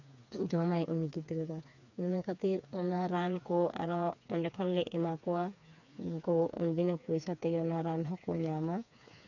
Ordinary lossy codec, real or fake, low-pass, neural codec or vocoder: none; fake; 7.2 kHz; codec, 16 kHz, 4 kbps, FreqCodec, smaller model